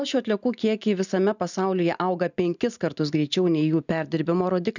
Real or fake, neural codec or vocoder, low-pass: real; none; 7.2 kHz